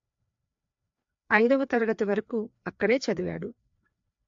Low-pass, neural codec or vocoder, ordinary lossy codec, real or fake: 7.2 kHz; codec, 16 kHz, 2 kbps, FreqCodec, larger model; AAC, 64 kbps; fake